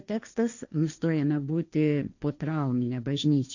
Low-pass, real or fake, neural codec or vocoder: 7.2 kHz; fake; codec, 16 kHz, 1.1 kbps, Voila-Tokenizer